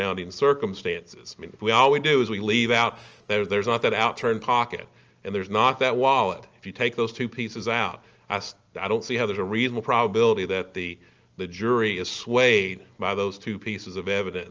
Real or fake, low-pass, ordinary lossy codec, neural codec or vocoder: real; 7.2 kHz; Opus, 24 kbps; none